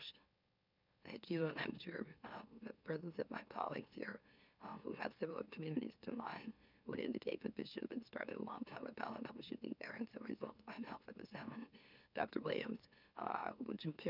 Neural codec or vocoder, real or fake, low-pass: autoencoder, 44.1 kHz, a latent of 192 numbers a frame, MeloTTS; fake; 5.4 kHz